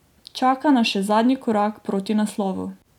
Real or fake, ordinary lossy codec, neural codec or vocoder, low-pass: real; none; none; 19.8 kHz